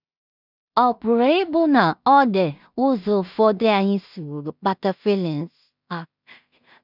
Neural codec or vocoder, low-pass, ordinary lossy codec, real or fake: codec, 16 kHz in and 24 kHz out, 0.4 kbps, LongCat-Audio-Codec, two codebook decoder; 5.4 kHz; none; fake